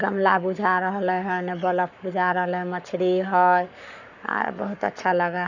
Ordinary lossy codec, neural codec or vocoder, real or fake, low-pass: none; codec, 44.1 kHz, 7.8 kbps, Pupu-Codec; fake; 7.2 kHz